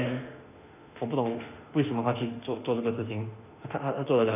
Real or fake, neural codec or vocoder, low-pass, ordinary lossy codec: fake; autoencoder, 48 kHz, 32 numbers a frame, DAC-VAE, trained on Japanese speech; 3.6 kHz; none